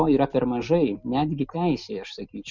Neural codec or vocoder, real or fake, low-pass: none; real; 7.2 kHz